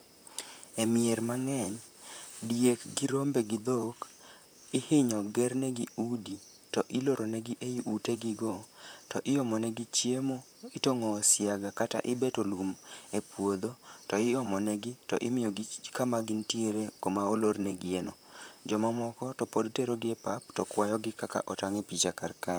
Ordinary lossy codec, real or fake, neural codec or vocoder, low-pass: none; fake; vocoder, 44.1 kHz, 128 mel bands, Pupu-Vocoder; none